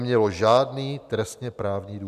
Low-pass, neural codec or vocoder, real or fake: 14.4 kHz; none; real